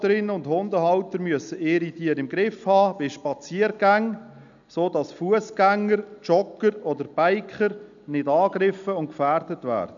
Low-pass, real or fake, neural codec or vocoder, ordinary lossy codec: 7.2 kHz; real; none; none